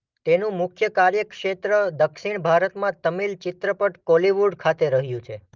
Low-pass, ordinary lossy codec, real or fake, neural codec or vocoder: 7.2 kHz; Opus, 32 kbps; real; none